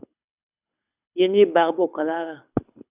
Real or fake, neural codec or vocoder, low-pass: fake; codec, 24 kHz, 6 kbps, HILCodec; 3.6 kHz